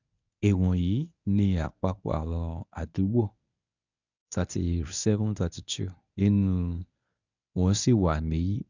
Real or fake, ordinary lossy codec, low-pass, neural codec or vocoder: fake; none; 7.2 kHz; codec, 24 kHz, 0.9 kbps, WavTokenizer, medium speech release version 1